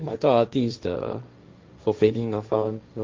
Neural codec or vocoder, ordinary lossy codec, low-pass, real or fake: codec, 16 kHz, 1.1 kbps, Voila-Tokenizer; Opus, 24 kbps; 7.2 kHz; fake